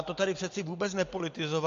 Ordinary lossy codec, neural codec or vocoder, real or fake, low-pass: AAC, 64 kbps; none; real; 7.2 kHz